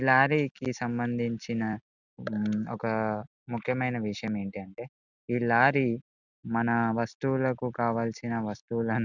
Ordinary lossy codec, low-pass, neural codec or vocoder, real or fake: none; 7.2 kHz; none; real